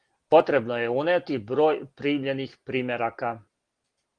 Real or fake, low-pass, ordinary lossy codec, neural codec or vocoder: real; 9.9 kHz; Opus, 24 kbps; none